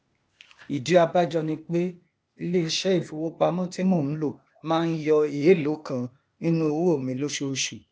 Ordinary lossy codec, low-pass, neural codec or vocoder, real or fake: none; none; codec, 16 kHz, 0.8 kbps, ZipCodec; fake